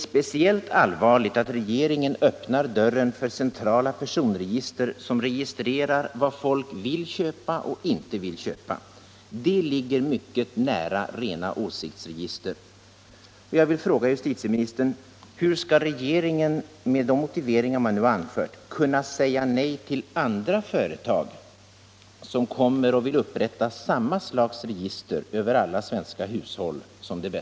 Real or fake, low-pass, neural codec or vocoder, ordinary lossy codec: real; none; none; none